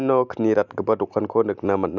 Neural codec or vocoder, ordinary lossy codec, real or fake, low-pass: none; none; real; 7.2 kHz